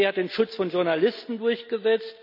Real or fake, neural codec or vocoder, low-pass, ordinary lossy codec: real; none; 5.4 kHz; none